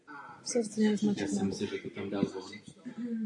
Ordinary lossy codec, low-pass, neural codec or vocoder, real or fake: AAC, 48 kbps; 10.8 kHz; none; real